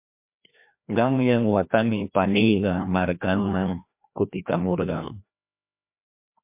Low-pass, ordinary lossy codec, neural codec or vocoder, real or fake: 3.6 kHz; MP3, 32 kbps; codec, 16 kHz, 1 kbps, FreqCodec, larger model; fake